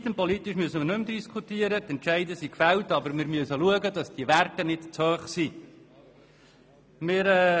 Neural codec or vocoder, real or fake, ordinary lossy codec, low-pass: none; real; none; none